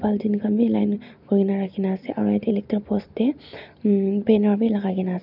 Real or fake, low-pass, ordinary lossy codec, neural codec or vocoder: real; 5.4 kHz; none; none